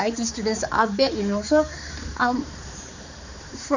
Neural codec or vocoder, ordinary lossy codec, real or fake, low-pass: codec, 16 kHz, 4 kbps, X-Codec, HuBERT features, trained on balanced general audio; none; fake; 7.2 kHz